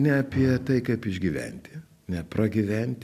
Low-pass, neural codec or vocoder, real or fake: 14.4 kHz; none; real